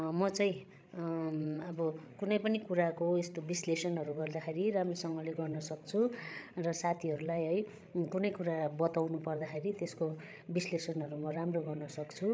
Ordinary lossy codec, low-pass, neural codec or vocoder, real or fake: none; none; codec, 16 kHz, 16 kbps, FreqCodec, larger model; fake